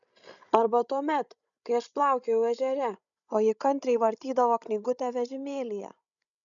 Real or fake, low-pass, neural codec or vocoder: fake; 7.2 kHz; codec, 16 kHz, 16 kbps, FreqCodec, larger model